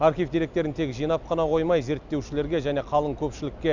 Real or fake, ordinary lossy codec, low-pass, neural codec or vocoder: real; none; 7.2 kHz; none